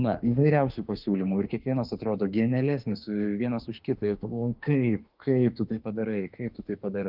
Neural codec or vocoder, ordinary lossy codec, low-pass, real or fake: autoencoder, 48 kHz, 32 numbers a frame, DAC-VAE, trained on Japanese speech; Opus, 32 kbps; 5.4 kHz; fake